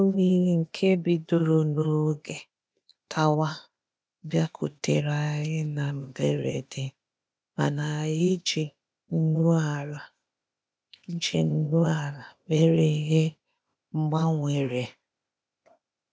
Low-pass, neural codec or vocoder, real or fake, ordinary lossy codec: none; codec, 16 kHz, 0.8 kbps, ZipCodec; fake; none